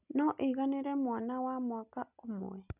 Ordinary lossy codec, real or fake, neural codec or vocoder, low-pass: none; real; none; 3.6 kHz